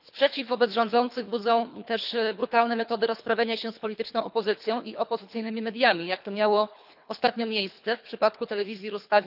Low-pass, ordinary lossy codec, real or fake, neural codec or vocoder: 5.4 kHz; none; fake; codec, 24 kHz, 3 kbps, HILCodec